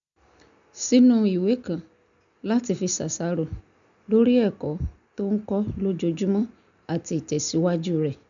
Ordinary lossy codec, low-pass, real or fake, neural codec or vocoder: none; 7.2 kHz; real; none